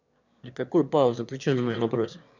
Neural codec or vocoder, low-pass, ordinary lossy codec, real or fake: autoencoder, 22.05 kHz, a latent of 192 numbers a frame, VITS, trained on one speaker; 7.2 kHz; none; fake